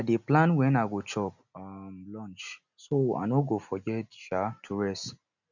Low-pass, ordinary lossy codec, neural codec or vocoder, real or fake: 7.2 kHz; none; none; real